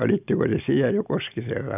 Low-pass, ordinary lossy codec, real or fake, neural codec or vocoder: 3.6 kHz; none; real; none